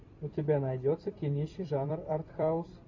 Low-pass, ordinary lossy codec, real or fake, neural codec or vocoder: 7.2 kHz; MP3, 48 kbps; fake; vocoder, 44.1 kHz, 128 mel bands every 256 samples, BigVGAN v2